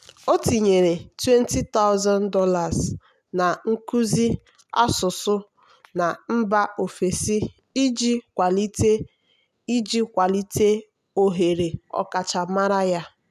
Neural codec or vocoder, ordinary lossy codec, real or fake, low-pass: none; none; real; 14.4 kHz